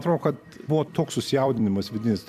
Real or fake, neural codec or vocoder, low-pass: fake; vocoder, 44.1 kHz, 128 mel bands every 256 samples, BigVGAN v2; 14.4 kHz